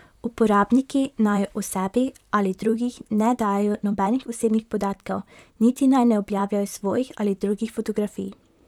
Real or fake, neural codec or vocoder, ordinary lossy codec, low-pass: fake; vocoder, 44.1 kHz, 128 mel bands, Pupu-Vocoder; none; 19.8 kHz